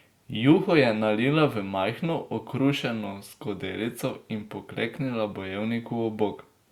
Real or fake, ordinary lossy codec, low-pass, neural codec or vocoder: real; Opus, 64 kbps; 19.8 kHz; none